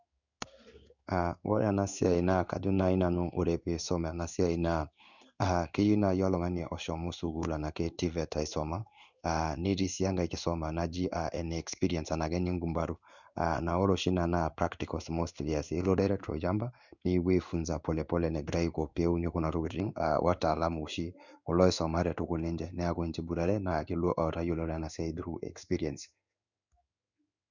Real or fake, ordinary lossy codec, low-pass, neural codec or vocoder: fake; none; 7.2 kHz; codec, 16 kHz in and 24 kHz out, 1 kbps, XY-Tokenizer